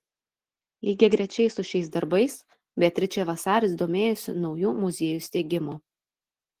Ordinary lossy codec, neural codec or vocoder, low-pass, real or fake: Opus, 16 kbps; none; 14.4 kHz; real